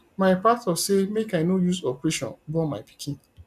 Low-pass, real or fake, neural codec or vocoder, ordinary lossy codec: 14.4 kHz; real; none; none